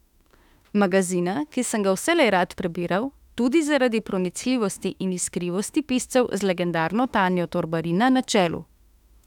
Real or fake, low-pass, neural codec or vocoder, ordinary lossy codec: fake; 19.8 kHz; autoencoder, 48 kHz, 32 numbers a frame, DAC-VAE, trained on Japanese speech; none